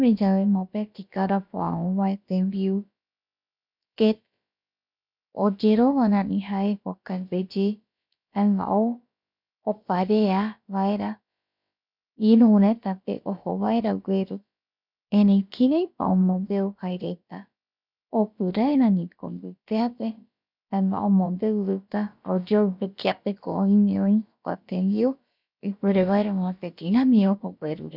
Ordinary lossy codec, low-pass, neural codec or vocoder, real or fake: none; 5.4 kHz; codec, 16 kHz, about 1 kbps, DyCAST, with the encoder's durations; fake